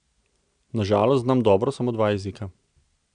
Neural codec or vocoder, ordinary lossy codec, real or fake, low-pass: none; none; real; 9.9 kHz